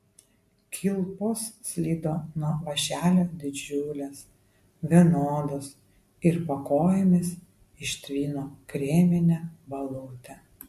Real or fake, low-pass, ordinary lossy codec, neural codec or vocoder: real; 14.4 kHz; MP3, 64 kbps; none